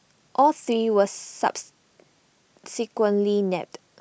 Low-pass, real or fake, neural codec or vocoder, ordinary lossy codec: none; real; none; none